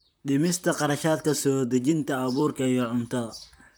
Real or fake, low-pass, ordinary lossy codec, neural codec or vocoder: fake; none; none; vocoder, 44.1 kHz, 128 mel bands, Pupu-Vocoder